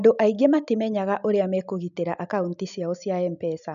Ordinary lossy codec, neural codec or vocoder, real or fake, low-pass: none; none; real; 7.2 kHz